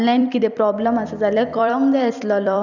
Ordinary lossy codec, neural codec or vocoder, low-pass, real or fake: none; vocoder, 44.1 kHz, 128 mel bands every 256 samples, BigVGAN v2; 7.2 kHz; fake